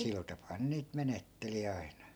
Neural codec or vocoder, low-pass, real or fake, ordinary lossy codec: none; none; real; none